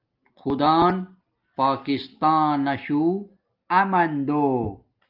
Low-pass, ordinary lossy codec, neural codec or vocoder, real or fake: 5.4 kHz; Opus, 24 kbps; none; real